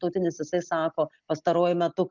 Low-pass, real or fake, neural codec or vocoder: 7.2 kHz; real; none